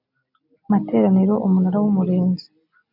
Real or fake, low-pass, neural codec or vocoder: real; 5.4 kHz; none